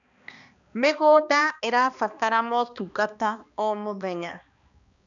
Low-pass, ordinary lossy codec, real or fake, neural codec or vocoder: 7.2 kHz; none; fake; codec, 16 kHz, 2 kbps, X-Codec, HuBERT features, trained on balanced general audio